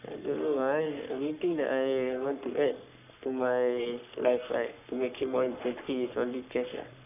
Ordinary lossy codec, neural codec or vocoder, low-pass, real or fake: none; codec, 44.1 kHz, 3.4 kbps, Pupu-Codec; 3.6 kHz; fake